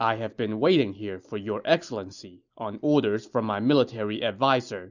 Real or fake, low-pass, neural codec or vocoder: real; 7.2 kHz; none